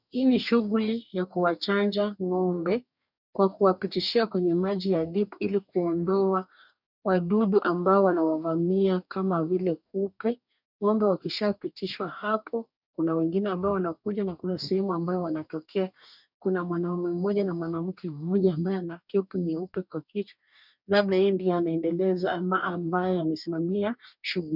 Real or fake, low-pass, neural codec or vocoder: fake; 5.4 kHz; codec, 44.1 kHz, 2.6 kbps, DAC